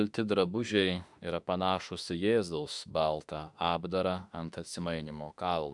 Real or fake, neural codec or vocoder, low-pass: fake; autoencoder, 48 kHz, 32 numbers a frame, DAC-VAE, trained on Japanese speech; 10.8 kHz